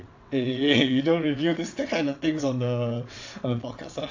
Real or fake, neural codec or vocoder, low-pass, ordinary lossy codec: fake; vocoder, 22.05 kHz, 80 mel bands, WaveNeXt; 7.2 kHz; AAC, 48 kbps